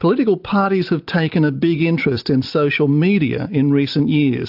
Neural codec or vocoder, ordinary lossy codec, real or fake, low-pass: none; AAC, 48 kbps; real; 5.4 kHz